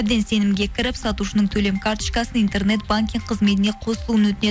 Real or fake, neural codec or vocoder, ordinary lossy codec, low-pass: real; none; none; none